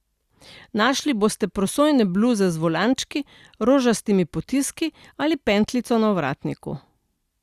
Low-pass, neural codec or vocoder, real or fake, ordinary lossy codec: 14.4 kHz; none; real; Opus, 64 kbps